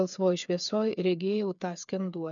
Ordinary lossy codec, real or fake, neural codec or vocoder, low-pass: MP3, 96 kbps; fake; codec, 16 kHz, 8 kbps, FreqCodec, smaller model; 7.2 kHz